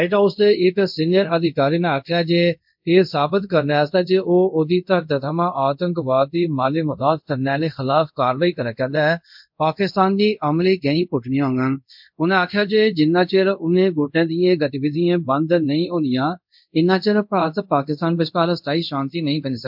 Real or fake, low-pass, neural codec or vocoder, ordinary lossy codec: fake; 5.4 kHz; codec, 24 kHz, 0.5 kbps, DualCodec; MP3, 48 kbps